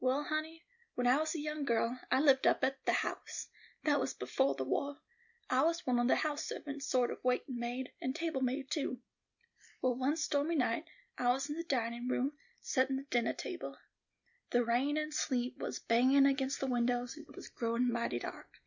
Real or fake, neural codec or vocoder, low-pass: real; none; 7.2 kHz